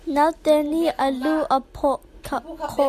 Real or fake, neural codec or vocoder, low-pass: real; none; 14.4 kHz